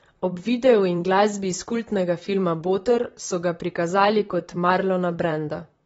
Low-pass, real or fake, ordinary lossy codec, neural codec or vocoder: 19.8 kHz; fake; AAC, 24 kbps; vocoder, 44.1 kHz, 128 mel bands, Pupu-Vocoder